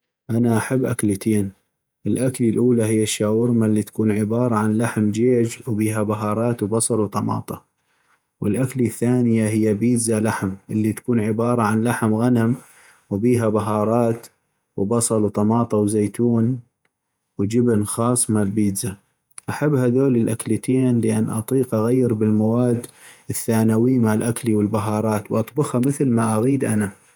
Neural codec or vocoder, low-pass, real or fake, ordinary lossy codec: vocoder, 48 kHz, 128 mel bands, Vocos; none; fake; none